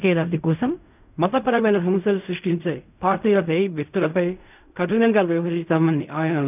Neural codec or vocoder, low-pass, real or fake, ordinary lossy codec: codec, 16 kHz in and 24 kHz out, 0.4 kbps, LongCat-Audio-Codec, fine tuned four codebook decoder; 3.6 kHz; fake; none